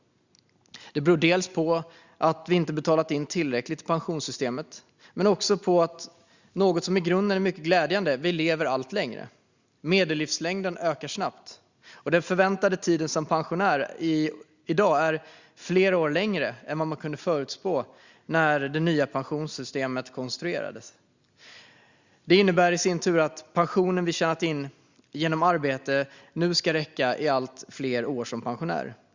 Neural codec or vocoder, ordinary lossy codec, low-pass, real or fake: none; Opus, 64 kbps; 7.2 kHz; real